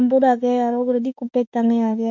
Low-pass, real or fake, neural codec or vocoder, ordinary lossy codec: 7.2 kHz; fake; autoencoder, 48 kHz, 32 numbers a frame, DAC-VAE, trained on Japanese speech; none